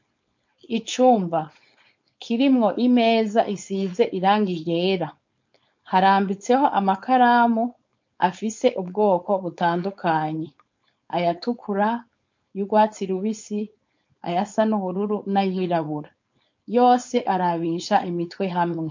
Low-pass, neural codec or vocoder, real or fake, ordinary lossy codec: 7.2 kHz; codec, 16 kHz, 4.8 kbps, FACodec; fake; MP3, 48 kbps